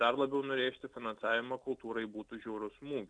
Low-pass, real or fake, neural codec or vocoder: 9.9 kHz; real; none